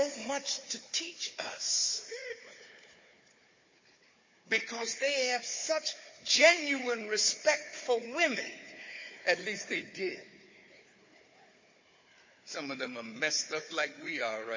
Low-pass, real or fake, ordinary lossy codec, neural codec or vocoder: 7.2 kHz; fake; MP3, 32 kbps; codec, 16 kHz, 4 kbps, FunCodec, trained on Chinese and English, 50 frames a second